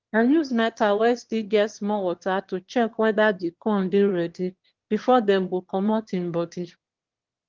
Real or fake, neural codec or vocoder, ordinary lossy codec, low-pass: fake; autoencoder, 22.05 kHz, a latent of 192 numbers a frame, VITS, trained on one speaker; Opus, 16 kbps; 7.2 kHz